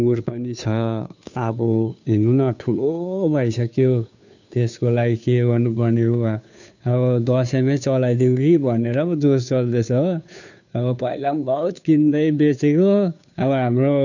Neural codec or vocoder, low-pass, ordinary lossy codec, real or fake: codec, 16 kHz, 2 kbps, FunCodec, trained on Chinese and English, 25 frames a second; 7.2 kHz; none; fake